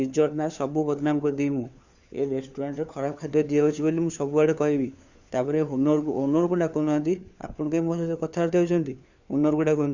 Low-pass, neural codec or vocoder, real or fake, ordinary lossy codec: 7.2 kHz; codec, 16 kHz, 4 kbps, FunCodec, trained on LibriTTS, 50 frames a second; fake; Opus, 64 kbps